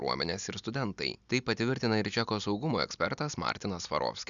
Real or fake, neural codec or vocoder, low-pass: real; none; 7.2 kHz